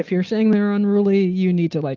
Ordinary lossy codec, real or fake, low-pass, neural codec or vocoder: Opus, 24 kbps; real; 7.2 kHz; none